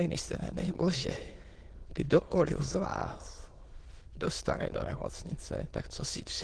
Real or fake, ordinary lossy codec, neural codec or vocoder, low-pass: fake; Opus, 16 kbps; autoencoder, 22.05 kHz, a latent of 192 numbers a frame, VITS, trained on many speakers; 9.9 kHz